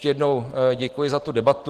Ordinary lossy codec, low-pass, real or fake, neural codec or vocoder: Opus, 16 kbps; 14.4 kHz; real; none